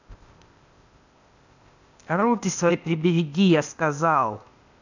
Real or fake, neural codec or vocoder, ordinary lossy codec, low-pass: fake; codec, 16 kHz, 0.8 kbps, ZipCodec; none; 7.2 kHz